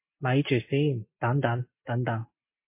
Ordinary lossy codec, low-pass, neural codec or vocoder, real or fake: MP3, 24 kbps; 3.6 kHz; none; real